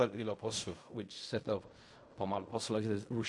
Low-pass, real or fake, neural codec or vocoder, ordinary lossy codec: 10.8 kHz; fake; codec, 16 kHz in and 24 kHz out, 0.4 kbps, LongCat-Audio-Codec, fine tuned four codebook decoder; MP3, 48 kbps